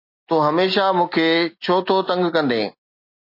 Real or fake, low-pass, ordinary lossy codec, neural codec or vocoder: real; 5.4 kHz; MP3, 32 kbps; none